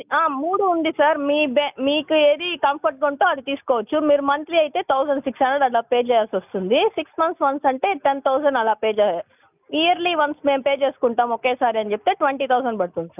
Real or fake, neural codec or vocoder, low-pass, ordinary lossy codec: real; none; 3.6 kHz; none